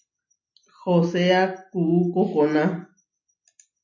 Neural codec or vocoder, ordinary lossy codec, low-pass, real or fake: none; MP3, 48 kbps; 7.2 kHz; real